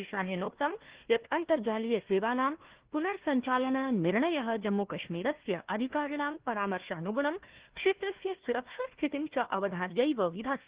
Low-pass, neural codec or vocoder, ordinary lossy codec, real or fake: 3.6 kHz; codec, 16 kHz, 1 kbps, FunCodec, trained on Chinese and English, 50 frames a second; Opus, 16 kbps; fake